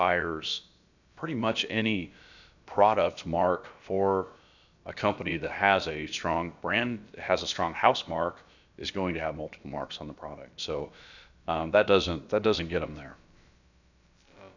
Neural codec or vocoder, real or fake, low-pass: codec, 16 kHz, about 1 kbps, DyCAST, with the encoder's durations; fake; 7.2 kHz